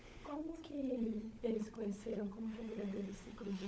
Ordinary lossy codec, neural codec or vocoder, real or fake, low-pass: none; codec, 16 kHz, 16 kbps, FunCodec, trained on LibriTTS, 50 frames a second; fake; none